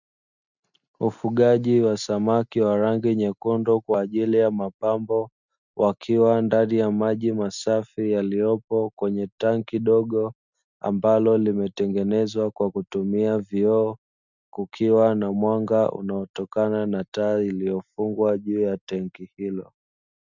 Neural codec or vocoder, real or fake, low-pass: none; real; 7.2 kHz